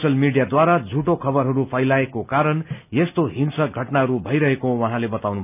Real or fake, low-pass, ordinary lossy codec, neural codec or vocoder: real; 3.6 kHz; none; none